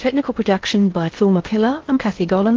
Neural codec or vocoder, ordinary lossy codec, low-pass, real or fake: codec, 16 kHz in and 24 kHz out, 0.6 kbps, FocalCodec, streaming, 4096 codes; Opus, 16 kbps; 7.2 kHz; fake